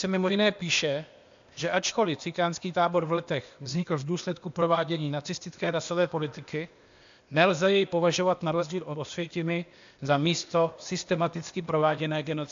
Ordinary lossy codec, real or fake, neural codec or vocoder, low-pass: AAC, 64 kbps; fake; codec, 16 kHz, 0.8 kbps, ZipCodec; 7.2 kHz